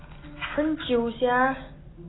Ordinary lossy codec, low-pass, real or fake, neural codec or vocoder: AAC, 16 kbps; 7.2 kHz; real; none